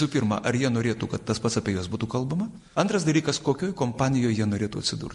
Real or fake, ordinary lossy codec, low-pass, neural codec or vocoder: real; MP3, 48 kbps; 14.4 kHz; none